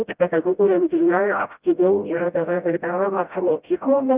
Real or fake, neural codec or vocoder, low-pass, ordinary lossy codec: fake; codec, 16 kHz, 0.5 kbps, FreqCodec, smaller model; 3.6 kHz; Opus, 24 kbps